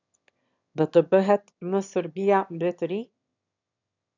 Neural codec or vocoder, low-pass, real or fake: autoencoder, 22.05 kHz, a latent of 192 numbers a frame, VITS, trained on one speaker; 7.2 kHz; fake